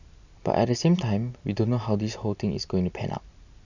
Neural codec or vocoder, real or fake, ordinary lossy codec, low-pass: none; real; none; 7.2 kHz